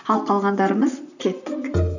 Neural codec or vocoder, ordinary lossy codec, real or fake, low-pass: vocoder, 44.1 kHz, 128 mel bands, Pupu-Vocoder; none; fake; 7.2 kHz